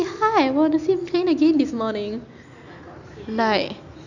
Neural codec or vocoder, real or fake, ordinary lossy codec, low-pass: none; real; none; 7.2 kHz